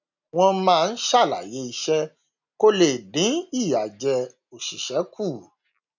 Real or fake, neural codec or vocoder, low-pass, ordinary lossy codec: real; none; 7.2 kHz; none